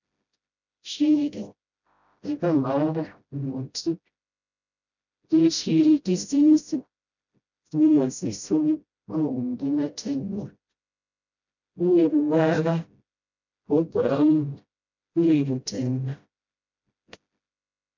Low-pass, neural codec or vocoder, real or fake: 7.2 kHz; codec, 16 kHz, 0.5 kbps, FreqCodec, smaller model; fake